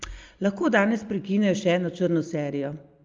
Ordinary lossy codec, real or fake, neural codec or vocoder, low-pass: Opus, 32 kbps; real; none; 7.2 kHz